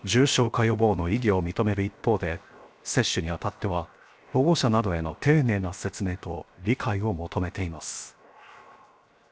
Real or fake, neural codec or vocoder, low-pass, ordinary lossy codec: fake; codec, 16 kHz, 0.7 kbps, FocalCodec; none; none